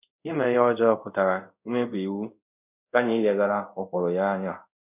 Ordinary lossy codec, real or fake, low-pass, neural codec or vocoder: none; fake; 3.6 kHz; codec, 24 kHz, 0.5 kbps, DualCodec